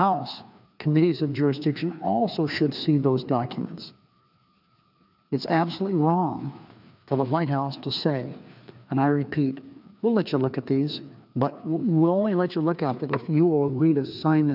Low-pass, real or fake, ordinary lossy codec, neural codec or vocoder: 5.4 kHz; fake; AAC, 48 kbps; codec, 16 kHz, 2 kbps, FreqCodec, larger model